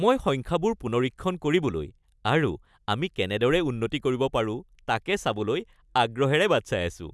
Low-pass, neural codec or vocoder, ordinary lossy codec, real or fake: none; none; none; real